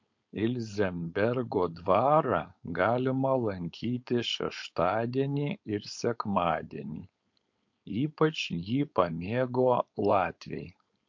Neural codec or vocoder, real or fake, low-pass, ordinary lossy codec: codec, 16 kHz, 4.8 kbps, FACodec; fake; 7.2 kHz; MP3, 48 kbps